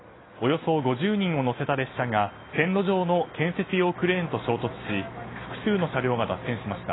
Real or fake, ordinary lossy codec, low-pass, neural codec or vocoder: real; AAC, 16 kbps; 7.2 kHz; none